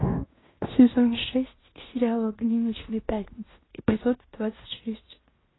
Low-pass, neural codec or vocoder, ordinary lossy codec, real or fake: 7.2 kHz; codec, 16 kHz in and 24 kHz out, 0.9 kbps, LongCat-Audio-Codec, fine tuned four codebook decoder; AAC, 16 kbps; fake